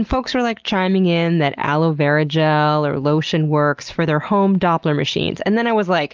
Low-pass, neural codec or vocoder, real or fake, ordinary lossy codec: 7.2 kHz; none; real; Opus, 32 kbps